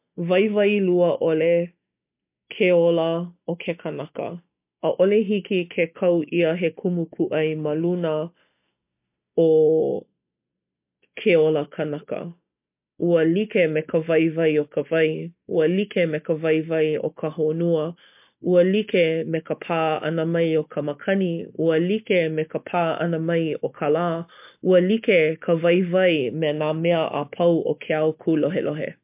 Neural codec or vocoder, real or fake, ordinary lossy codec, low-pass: none; real; MP3, 32 kbps; 3.6 kHz